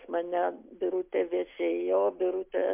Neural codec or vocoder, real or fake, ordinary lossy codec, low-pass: none; real; MP3, 32 kbps; 3.6 kHz